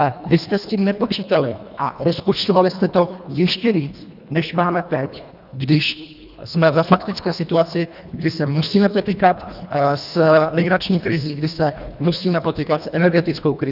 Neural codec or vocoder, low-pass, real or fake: codec, 24 kHz, 1.5 kbps, HILCodec; 5.4 kHz; fake